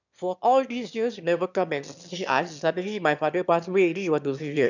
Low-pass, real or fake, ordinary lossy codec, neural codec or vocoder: 7.2 kHz; fake; none; autoencoder, 22.05 kHz, a latent of 192 numbers a frame, VITS, trained on one speaker